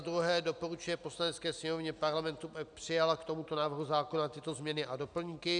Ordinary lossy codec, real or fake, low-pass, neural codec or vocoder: MP3, 96 kbps; real; 9.9 kHz; none